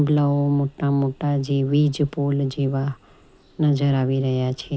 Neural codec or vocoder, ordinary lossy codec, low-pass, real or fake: none; none; none; real